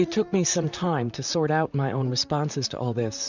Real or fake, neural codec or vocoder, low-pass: fake; vocoder, 44.1 kHz, 128 mel bands, Pupu-Vocoder; 7.2 kHz